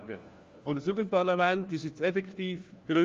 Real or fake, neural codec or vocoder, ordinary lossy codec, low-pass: fake; codec, 16 kHz, 1 kbps, FunCodec, trained on LibriTTS, 50 frames a second; Opus, 32 kbps; 7.2 kHz